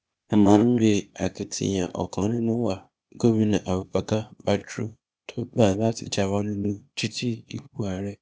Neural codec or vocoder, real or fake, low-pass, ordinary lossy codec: codec, 16 kHz, 0.8 kbps, ZipCodec; fake; none; none